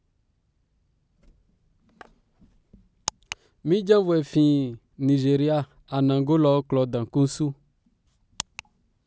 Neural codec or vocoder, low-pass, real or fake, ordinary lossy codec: none; none; real; none